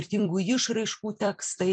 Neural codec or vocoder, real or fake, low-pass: vocoder, 44.1 kHz, 128 mel bands every 256 samples, BigVGAN v2; fake; 9.9 kHz